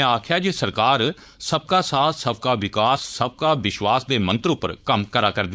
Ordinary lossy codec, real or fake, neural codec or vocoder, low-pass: none; fake; codec, 16 kHz, 4.8 kbps, FACodec; none